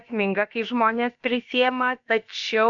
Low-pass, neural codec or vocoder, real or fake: 7.2 kHz; codec, 16 kHz, about 1 kbps, DyCAST, with the encoder's durations; fake